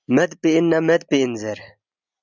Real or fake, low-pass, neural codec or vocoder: real; 7.2 kHz; none